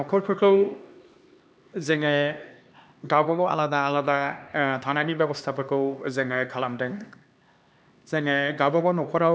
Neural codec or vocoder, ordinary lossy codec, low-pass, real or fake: codec, 16 kHz, 2 kbps, X-Codec, HuBERT features, trained on LibriSpeech; none; none; fake